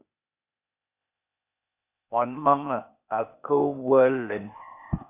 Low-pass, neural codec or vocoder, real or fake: 3.6 kHz; codec, 16 kHz, 0.8 kbps, ZipCodec; fake